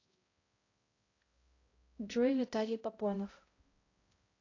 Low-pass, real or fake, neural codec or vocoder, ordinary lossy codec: 7.2 kHz; fake; codec, 16 kHz, 0.5 kbps, X-Codec, HuBERT features, trained on balanced general audio; AAC, 32 kbps